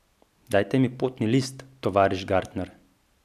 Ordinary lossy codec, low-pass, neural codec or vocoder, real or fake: none; 14.4 kHz; none; real